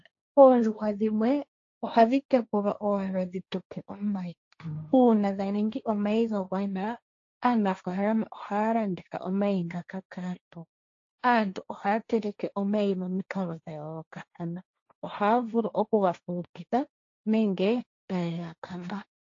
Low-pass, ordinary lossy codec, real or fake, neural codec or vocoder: 7.2 kHz; AAC, 48 kbps; fake; codec, 16 kHz, 1.1 kbps, Voila-Tokenizer